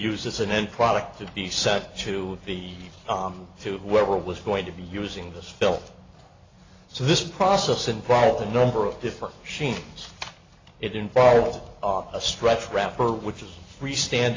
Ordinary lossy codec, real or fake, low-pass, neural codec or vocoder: AAC, 32 kbps; real; 7.2 kHz; none